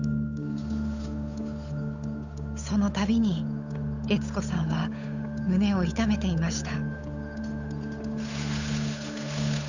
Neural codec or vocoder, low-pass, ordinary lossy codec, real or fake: codec, 16 kHz, 8 kbps, FunCodec, trained on Chinese and English, 25 frames a second; 7.2 kHz; none; fake